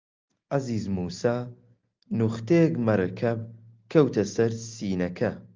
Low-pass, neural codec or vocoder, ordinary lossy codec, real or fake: 7.2 kHz; none; Opus, 32 kbps; real